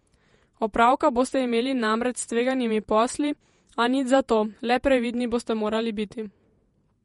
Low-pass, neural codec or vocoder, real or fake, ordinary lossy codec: 19.8 kHz; vocoder, 48 kHz, 128 mel bands, Vocos; fake; MP3, 48 kbps